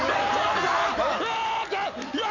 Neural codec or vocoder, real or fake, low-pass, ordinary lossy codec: none; real; 7.2 kHz; none